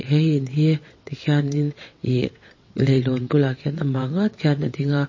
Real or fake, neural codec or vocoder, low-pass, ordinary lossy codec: fake; vocoder, 22.05 kHz, 80 mel bands, WaveNeXt; 7.2 kHz; MP3, 32 kbps